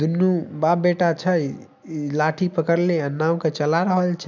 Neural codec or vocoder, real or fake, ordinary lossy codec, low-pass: none; real; none; 7.2 kHz